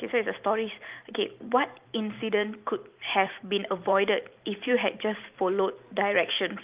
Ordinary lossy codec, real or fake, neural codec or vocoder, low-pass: Opus, 32 kbps; fake; vocoder, 44.1 kHz, 128 mel bands every 512 samples, BigVGAN v2; 3.6 kHz